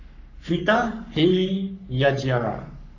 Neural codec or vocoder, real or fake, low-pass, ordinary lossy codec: codec, 44.1 kHz, 3.4 kbps, Pupu-Codec; fake; 7.2 kHz; none